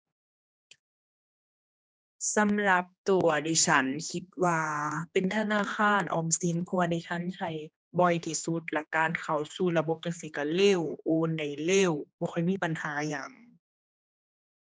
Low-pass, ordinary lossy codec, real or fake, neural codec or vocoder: none; none; fake; codec, 16 kHz, 2 kbps, X-Codec, HuBERT features, trained on general audio